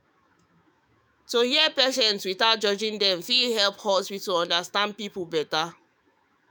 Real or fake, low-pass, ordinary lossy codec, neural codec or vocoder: fake; none; none; autoencoder, 48 kHz, 128 numbers a frame, DAC-VAE, trained on Japanese speech